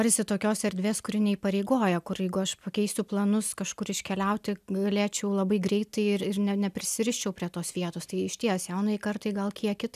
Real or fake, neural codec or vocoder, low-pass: real; none; 14.4 kHz